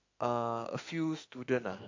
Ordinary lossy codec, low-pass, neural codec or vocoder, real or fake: AAC, 48 kbps; 7.2 kHz; autoencoder, 48 kHz, 32 numbers a frame, DAC-VAE, trained on Japanese speech; fake